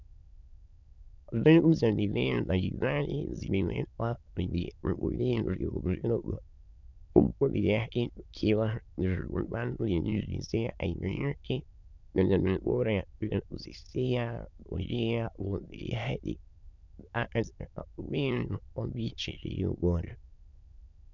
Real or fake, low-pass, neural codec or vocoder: fake; 7.2 kHz; autoencoder, 22.05 kHz, a latent of 192 numbers a frame, VITS, trained on many speakers